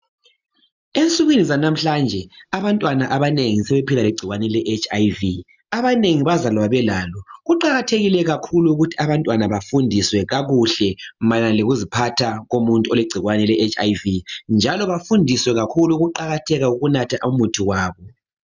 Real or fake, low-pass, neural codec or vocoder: real; 7.2 kHz; none